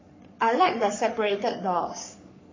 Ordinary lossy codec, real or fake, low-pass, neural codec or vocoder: MP3, 32 kbps; fake; 7.2 kHz; codec, 44.1 kHz, 3.4 kbps, Pupu-Codec